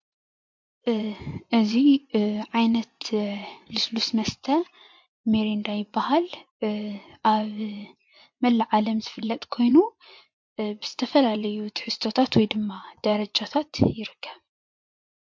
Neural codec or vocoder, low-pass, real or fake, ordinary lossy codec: vocoder, 24 kHz, 100 mel bands, Vocos; 7.2 kHz; fake; MP3, 48 kbps